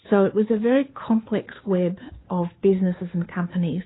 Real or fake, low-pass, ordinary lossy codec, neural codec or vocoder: fake; 7.2 kHz; AAC, 16 kbps; codec, 16 kHz, 2 kbps, FunCodec, trained on Chinese and English, 25 frames a second